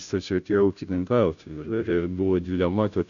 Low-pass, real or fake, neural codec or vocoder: 7.2 kHz; fake; codec, 16 kHz, 0.5 kbps, FunCodec, trained on Chinese and English, 25 frames a second